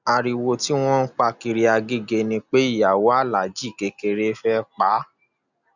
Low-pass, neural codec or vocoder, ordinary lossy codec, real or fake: 7.2 kHz; none; none; real